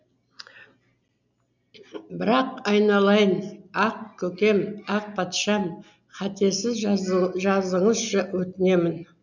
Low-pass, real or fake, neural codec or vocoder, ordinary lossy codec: 7.2 kHz; real; none; none